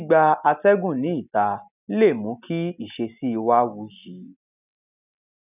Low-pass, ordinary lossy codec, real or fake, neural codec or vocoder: 3.6 kHz; none; real; none